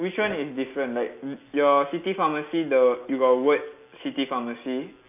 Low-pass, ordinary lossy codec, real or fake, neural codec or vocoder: 3.6 kHz; none; real; none